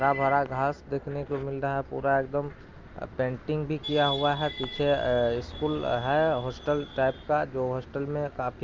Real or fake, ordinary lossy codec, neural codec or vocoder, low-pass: real; Opus, 24 kbps; none; 7.2 kHz